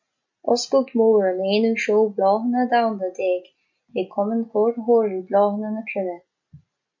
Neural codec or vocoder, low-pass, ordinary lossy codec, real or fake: none; 7.2 kHz; MP3, 64 kbps; real